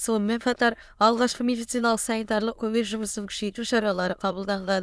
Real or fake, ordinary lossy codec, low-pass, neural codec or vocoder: fake; none; none; autoencoder, 22.05 kHz, a latent of 192 numbers a frame, VITS, trained on many speakers